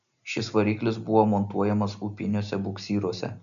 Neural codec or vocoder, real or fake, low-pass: none; real; 7.2 kHz